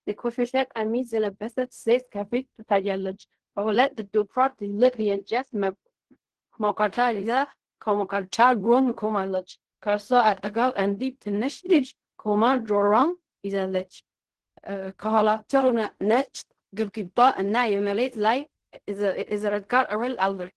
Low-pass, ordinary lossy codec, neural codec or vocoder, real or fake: 10.8 kHz; Opus, 16 kbps; codec, 16 kHz in and 24 kHz out, 0.4 kbps, LongCat-Audio-Codec, fine tuned four codebook decoder; fake